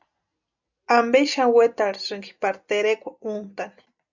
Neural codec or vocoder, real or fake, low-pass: none; real; 7.2 kHz